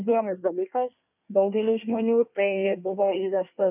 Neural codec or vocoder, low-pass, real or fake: codec, 24 kHz, 1 kbps, SNAC; 3.6 kHz; fake